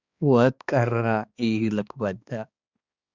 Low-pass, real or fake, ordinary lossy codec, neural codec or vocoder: 7.2 kHz; fake; Opus, 64 kbps; codec, 16 kHz, 2 kbps, X-Codec, HuBERT features, trained on balanced general audio